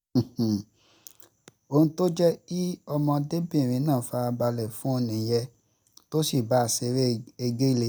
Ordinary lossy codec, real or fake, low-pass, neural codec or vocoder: none; real; none; none